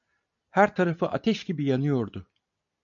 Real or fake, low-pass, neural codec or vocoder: real; 7.2 kHz; none